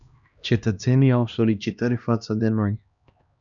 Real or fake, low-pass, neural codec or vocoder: fake; 7.2 kHz; codec, 16 kHz, 1 kbps, X-Codec, HuBERT features, trained on LibriSpeech